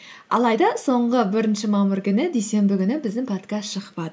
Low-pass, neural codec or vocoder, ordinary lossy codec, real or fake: none; none; none; real